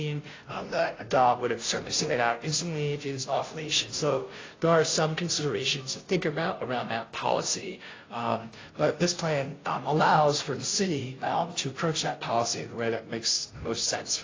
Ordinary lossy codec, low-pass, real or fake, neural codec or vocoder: AAC, 32 kbps; 7.2 kHz; fake; codec, 16 kHz, 0.5 kbps, FunCodec, trained on Chinese and English, 25 frames a second